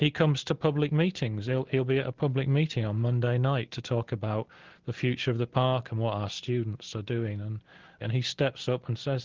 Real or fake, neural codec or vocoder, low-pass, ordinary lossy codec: real; none; 7.2 kHz; Opus, 16 kbps